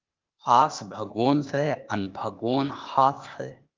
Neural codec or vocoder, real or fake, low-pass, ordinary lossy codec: codec, 16 kHz, 0.8 kbps, ZipCodec; fake; 7.2 kHz; Opus, 24 kbps